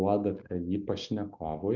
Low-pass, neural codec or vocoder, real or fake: 7.2 kHz; none; real